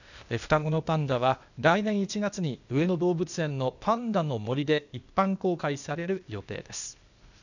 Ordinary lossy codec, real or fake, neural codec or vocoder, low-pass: none; fake; codec, 16 kHz, 0.8 kbps, ZipCodec; 7.2 kHz